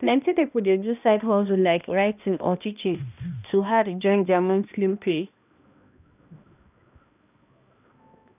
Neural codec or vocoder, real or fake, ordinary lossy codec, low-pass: codec, 16 kHz, 1 kbps, X-Codec, HuBERT features, trained on balanced general audio; fake; none; 3.6 kHz